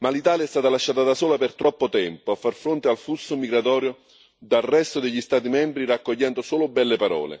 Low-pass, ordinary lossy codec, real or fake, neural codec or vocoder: none; none; real; none